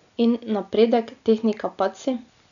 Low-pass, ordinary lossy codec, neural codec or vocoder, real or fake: 7.2 kHz; none; none; real